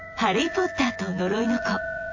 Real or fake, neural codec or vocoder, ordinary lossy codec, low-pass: fake; vocoder, 24 kHz, 100 mel bands, Vocos; AAC, 48 kbps; 7.2 kHz